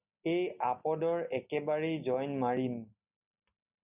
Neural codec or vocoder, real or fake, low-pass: none; real; 3.6 kHz